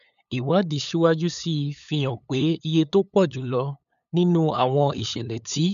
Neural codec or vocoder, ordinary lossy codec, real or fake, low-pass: codec, 16 kHz, 8 kbps, FunCodec, trained on LibriTTS, 25 frames a second; none; fake; 7.2 kHz